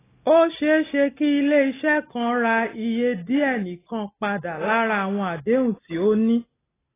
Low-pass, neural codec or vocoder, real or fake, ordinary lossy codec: 3.6 kHz; none; real; AAC, 16 kbps